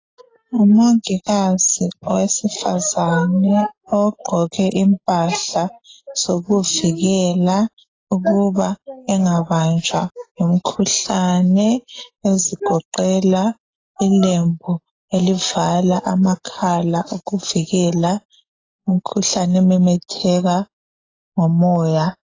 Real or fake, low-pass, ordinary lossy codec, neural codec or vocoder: real; 7.2 kHz; AAC, 32 kbps; none